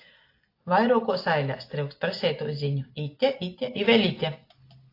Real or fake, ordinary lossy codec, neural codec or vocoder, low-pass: real; AAC, 32 kbps; none; 5.4 kHz